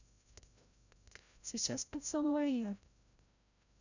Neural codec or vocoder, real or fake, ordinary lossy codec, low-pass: codec, 16 kHz, 0.5 kbps, FreqCodec, larger model; fake; none; 7.2 kHz